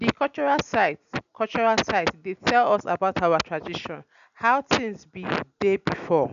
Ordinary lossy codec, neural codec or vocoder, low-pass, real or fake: none; none; 7.2 kHz; real